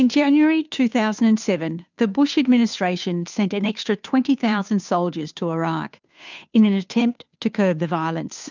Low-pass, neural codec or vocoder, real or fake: 7.2 kHz; codec, 16 kHz, 2 kbps, FunCodec, trained on Chinese and English, 25 frames a second; fake